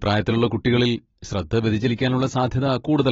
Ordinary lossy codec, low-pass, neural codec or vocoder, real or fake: AAC, 24 kbps; 7.2 kHz; none; real